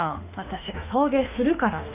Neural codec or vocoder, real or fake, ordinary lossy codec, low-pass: autoencoder, 48 kHz, 32 numbers a frame, DAC-VAE, trained on Japanese speech; fake; none; 3.6 kHz